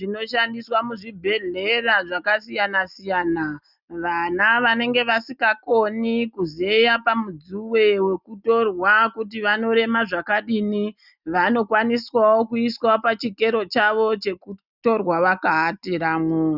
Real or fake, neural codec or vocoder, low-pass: real; none; 5.4 kHz